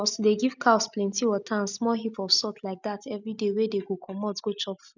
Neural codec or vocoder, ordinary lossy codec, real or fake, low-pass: none; none; real; 7.2 kHz